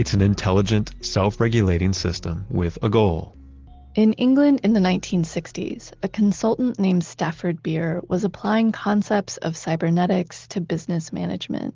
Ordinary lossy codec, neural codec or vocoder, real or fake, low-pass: Opus, 16 kbps; none; real; 7.2 kHz